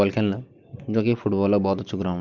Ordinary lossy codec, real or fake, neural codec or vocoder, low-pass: Opus, 24 kbps; real; none; 7.2 kHz